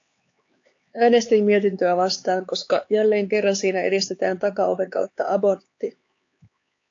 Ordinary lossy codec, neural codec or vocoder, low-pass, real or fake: AAC, 48 kbps; codec, 16 kHz, 4 kbps, X-Codec, HuBERT features, trained on LibriSpeech; 7.2 kHz; fake